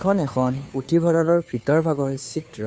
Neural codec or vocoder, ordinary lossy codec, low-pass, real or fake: codec, 16 kHz, 2 kbps, FunCodec, trained on Chinese and English, 25 frames a second; none; none; fake